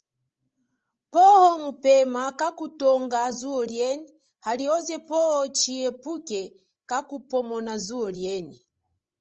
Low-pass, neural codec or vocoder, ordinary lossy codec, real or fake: 7.2 kHz; codec, 16 kHz, 16 kbps, FreqCodec, larger model; Opus, 24 kbps; fake